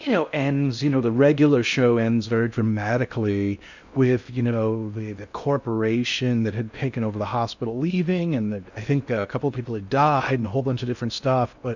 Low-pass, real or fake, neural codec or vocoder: 7.2 kHz; fake; codec, 16 kHz in and 24 kHz out, 0.6 kbps, FocalCodec, streaming, 4096 codes